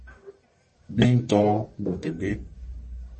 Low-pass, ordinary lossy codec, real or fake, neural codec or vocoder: 10.8 kHz; MP3, 32 kbps; fake; codec, 44.1 kHz, 1.7 kbps, Pupu-Codec